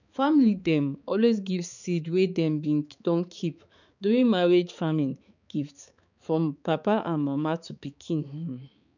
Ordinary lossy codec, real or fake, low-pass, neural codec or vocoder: none; fake; 7.2 kHz; codec, 16 kHz, 4 kbps, X-Codec, HuBERT features, trained on balanced general audio